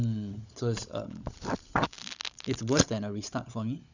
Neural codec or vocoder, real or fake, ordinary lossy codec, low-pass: codec, 16 kHz, 16 kbps, FunCodec, trained on LibriTTS, 50 frames a second; fake; none; 7.2 kHz